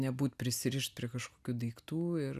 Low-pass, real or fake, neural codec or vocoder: 14.4 kHz; real; none